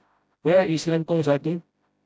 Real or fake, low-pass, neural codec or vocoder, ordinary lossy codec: fake; none; codec, 16 kHz, 0.5 kbps, FreqCodec, smaller model; none